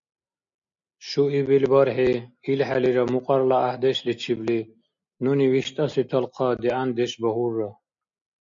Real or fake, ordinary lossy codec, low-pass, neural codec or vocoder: real; MP3, 48 kbps; 7.2 kHz; none